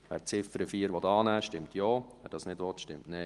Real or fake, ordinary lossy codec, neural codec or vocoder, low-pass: real; Opus, 24 kbps; none; 10.8 kHz